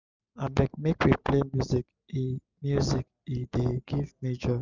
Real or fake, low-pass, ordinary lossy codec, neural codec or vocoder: real; 7.2 kHz; none; none